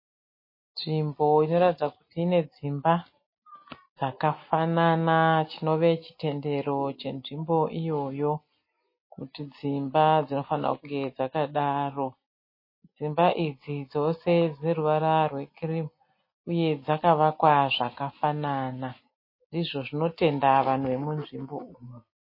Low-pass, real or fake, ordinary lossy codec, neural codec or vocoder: 5.4 kHz; real; MP3, 24 kbps; none